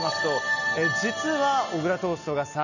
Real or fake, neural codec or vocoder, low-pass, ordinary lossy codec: real; none; 7.2 kHz; none